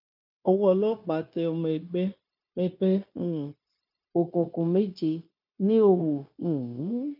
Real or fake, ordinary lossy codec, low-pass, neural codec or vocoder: fake; none; 5.4 kHz; codec, 16 kHz, 0.9 kbps, LongCat-Audio-Codec